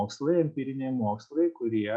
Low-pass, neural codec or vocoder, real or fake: 9.9 kHz; none; real